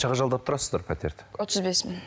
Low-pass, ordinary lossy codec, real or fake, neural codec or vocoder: none; none; real; none